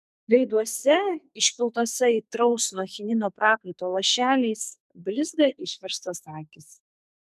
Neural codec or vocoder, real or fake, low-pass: codec, 44.1 kHz, 2.6 kbps, SNAC; fake; 14.4 kHz